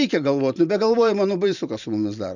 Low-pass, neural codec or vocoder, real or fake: 7.2 kHz; vocoder, 44.1 kHz, 128 mel bands every 512 samples, BigVGAN v2; fake